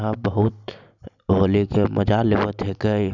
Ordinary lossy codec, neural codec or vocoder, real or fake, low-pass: none; none; real; 7.2 kHz